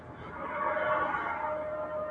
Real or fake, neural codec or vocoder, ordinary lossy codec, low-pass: real; none; AAC, 64 kbps; 9.9 kHz